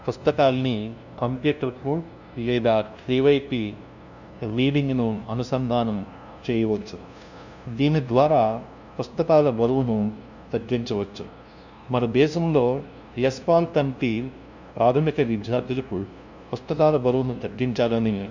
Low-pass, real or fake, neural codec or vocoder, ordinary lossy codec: 7.2 kHz; fake; codec, 16 kHz, 0.5 kbps, FunCodec, trained on LibriTTS, 25 frames a second; none